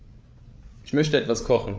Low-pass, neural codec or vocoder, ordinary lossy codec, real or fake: none; codec, 16 kHz, 8 kbps, FreqCodec, larger model; none; fake